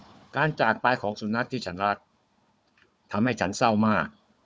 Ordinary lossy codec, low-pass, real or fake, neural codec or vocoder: none; none; fake; codec, 16 kHz, 4 kbps, FunCodec, trained on Chinese and English, 50 frames a second